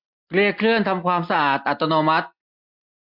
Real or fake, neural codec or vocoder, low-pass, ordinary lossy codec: real; none; 5.4 kHz; MP3, 48 kbps